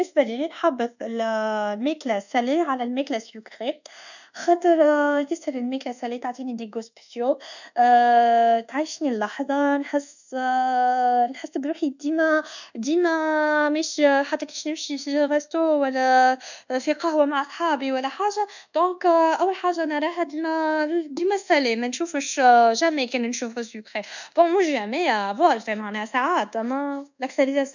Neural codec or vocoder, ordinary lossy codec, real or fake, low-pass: codec, 24 kHz, 1.2 kbps, DualCodec; none; fake; 7.2 kHz